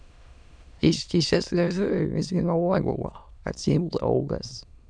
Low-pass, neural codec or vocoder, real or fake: 9.9 kHz; autoencoder, 22.05 kHz, a latent of 192 numbers a frame, VITS, trained on many speakers; fake